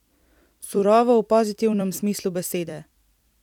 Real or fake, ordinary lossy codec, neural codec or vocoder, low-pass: fake; none; vocoder, 44.1 kHz, 128 mel bands every 256 samples, BigVGAN v2; 19.8 kHz